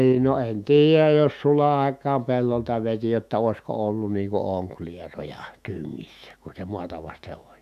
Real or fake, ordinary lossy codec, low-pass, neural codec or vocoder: fake; none; 14.4 kHz; autoencoder, 48 kHz, 128 numbers a frame, DAC-VAE, trained on Japanese speech